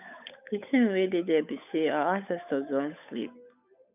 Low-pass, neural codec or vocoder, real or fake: 3.6 kHz; codec, 16 kHz, 8 kbps, FunCodec, trained on Chinese and English, 25 frames a second; fake